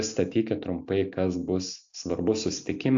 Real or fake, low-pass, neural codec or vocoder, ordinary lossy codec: real; 7.2 kHz; none; AAC, 64 kbps